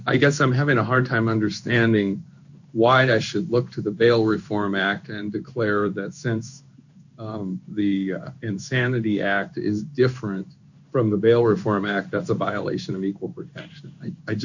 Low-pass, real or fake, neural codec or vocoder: 7.2 kHz; fake; codec, 16 kHz in and 24 kHz out, 1 kbps, XY-Tokenizer